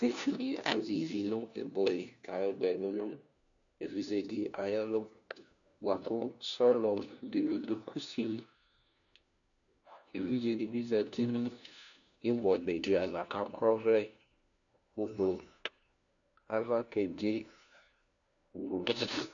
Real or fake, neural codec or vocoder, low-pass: fake; codec, 16 kHz, 1 kbps, FunCodec, trained on LibriTTS, 50 frames a second; 7.2 kHz